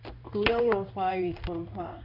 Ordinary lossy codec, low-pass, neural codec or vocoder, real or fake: none; 5.4 kHz; codec, 16 kHz in and 24 kHz out, 1 kbps, XY-Tokenizer; fake